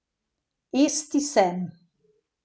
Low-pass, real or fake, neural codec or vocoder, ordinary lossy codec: none; real; none; none